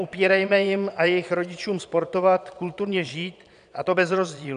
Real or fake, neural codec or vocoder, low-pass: fake; vocoder, 22.05 kHz, 80 mel bands, Vocos; 9.9 kHz